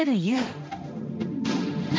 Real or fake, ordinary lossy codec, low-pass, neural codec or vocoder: fake; none; none; codec, 16 kHz, 1.1 kbps, Voila-Tokenizer